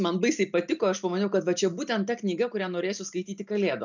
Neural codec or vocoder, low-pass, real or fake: none; 7.2 kHz; real